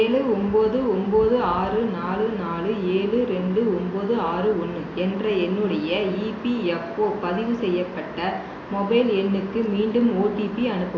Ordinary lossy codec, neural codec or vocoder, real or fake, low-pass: AAC, 32 kbps; none; real; 7.2 kHz